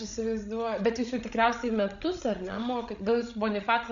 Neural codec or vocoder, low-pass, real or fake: codec, 16 kHz, 16 kbps, FreqCodec, larger model; 7.2 kHz; fake